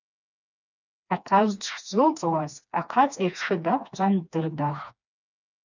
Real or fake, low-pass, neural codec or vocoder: fake; 7.2 kHz; codec, 16 kHz, 2 kbps, FreqCodec, smaller model